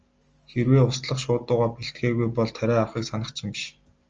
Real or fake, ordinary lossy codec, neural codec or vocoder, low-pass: real; Opus, 24 kbps; none; 7.2 kHz